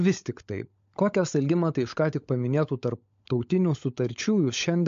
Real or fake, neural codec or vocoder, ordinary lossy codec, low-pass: fake; codec, 16 kHz, 16 kbps, FunCodec, trained on LibriTTS, 50 frames a second; MP3, 48 kbps; 7.2 kHz